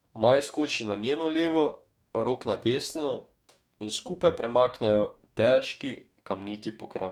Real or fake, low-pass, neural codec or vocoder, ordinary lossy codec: fake; 19.8 kHz; codec, 44.1 kHz, 2.6 kbps, DAC; none